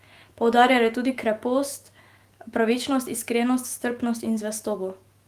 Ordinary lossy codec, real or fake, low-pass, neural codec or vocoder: Opus, 24 kbps; fake; 14.4 kHz; autoencoder, 48 kHz, 128 numbers a frame, DAC-VAE, trained on Japanese speech